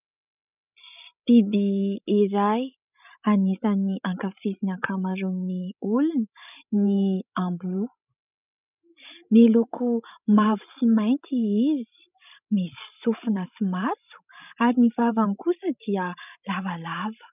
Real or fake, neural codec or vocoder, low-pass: fake; codec, 16 kHz, 16 kbps, FreqCodec, larger model; 3.6 kHz